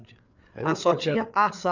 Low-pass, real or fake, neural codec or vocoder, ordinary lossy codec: 7.2 kHz; fake; codec, 16 kHz, 8 kbps, FreqCodec, larger model; none